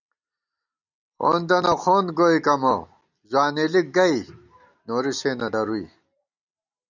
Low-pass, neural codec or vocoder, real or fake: 7.2 kHz; none; real